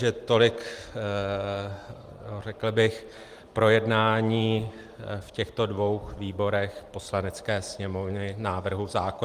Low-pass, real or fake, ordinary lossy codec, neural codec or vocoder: 14.4 kHz; real; Opus, 24 kbps; none